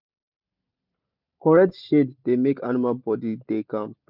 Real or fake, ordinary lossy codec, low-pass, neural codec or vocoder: real; none; 5.4 kHz; none